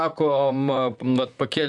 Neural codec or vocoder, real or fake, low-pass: vocoder, 44.1 kHz, 128 mel bands, Pupu-Vocoder; fake; 10.8 kHz